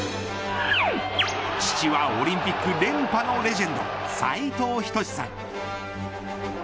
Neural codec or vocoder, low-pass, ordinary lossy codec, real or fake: none; none; none; real